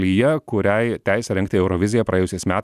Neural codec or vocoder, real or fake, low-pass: none; real; 14.4 kHz